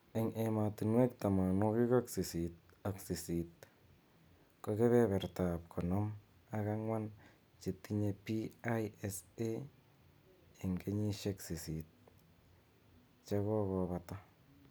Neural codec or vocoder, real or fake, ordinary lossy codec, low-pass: none; real; none; none